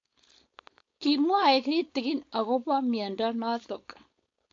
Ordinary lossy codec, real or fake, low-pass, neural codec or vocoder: AAC, 48 kbps; fake; 7.2 kHz; codec, 16 kHz, 4.8 kbps, FACodec